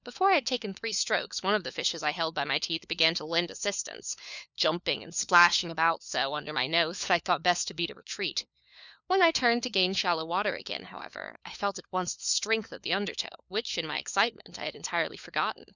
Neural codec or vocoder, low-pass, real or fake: codec, 16 kHz, 4 kbps, FunCodec, trained on LibriTTS, 50 frames a second; 7.2 kHz; fake